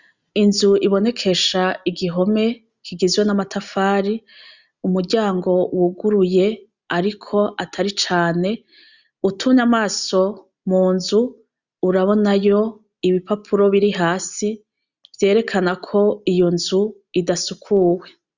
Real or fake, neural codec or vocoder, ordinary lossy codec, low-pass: real; none; Opus, 64 kbps; 7.2 kHz